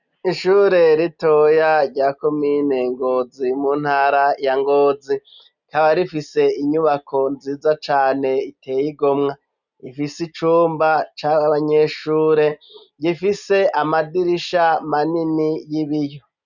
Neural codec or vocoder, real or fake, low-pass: none; real; 7.2 kHz